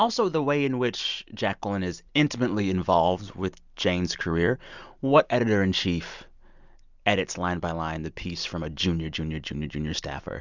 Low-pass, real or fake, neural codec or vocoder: 7.2 kHz; real; none